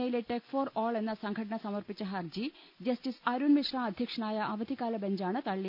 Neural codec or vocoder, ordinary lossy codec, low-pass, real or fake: none; none; 5.4 kHz; real